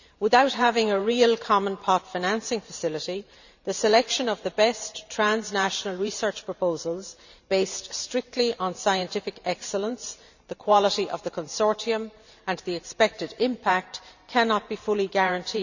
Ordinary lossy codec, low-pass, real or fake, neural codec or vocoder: none; 7.2 kHz; fake; vocoder, 44.1 kHz, 128 mel bands every 512 samples, BigVGAN v2